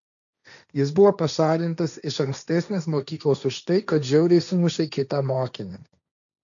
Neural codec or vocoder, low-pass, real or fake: codec, 16 kHz, 1.1 kbps, Voila-Tokenizer; 7.2 kHz; fake